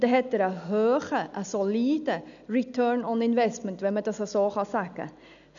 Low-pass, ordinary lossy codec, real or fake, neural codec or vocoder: 7.2 kHz; none; real; none